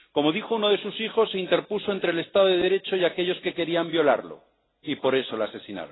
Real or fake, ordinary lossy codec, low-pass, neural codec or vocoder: real; AAC, 16 kbps; 7.2 kHz; none